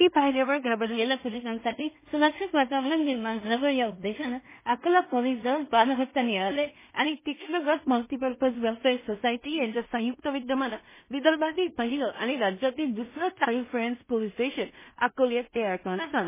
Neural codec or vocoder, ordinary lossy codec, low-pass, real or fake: codec, 16 kHz in and 24 kHz out, 0.4 kbps, LongCat-Audio-Codec, two codebook decoder; MP3, 16 kbps; 3.6 kHz; fake